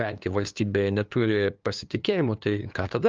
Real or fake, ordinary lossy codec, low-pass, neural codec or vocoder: fake; Opus, 24 kbps; 7.2 kHz; codec, 16 kHz, 2 kbps, FunCodec, trained on LibriTTS, 25 frames a second